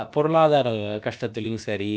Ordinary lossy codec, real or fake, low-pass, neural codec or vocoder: none; fake; none; codec, 16 kHz, about 1 kbps, DyCAST, with the encoder's durations